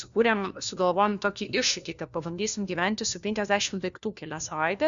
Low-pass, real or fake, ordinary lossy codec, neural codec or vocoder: 7.2 kHz; fake; Opus, 64 kbps; codec, 16 kHz, 1 kbps, FunCodec, trained on LibriTTS, 50 frames a second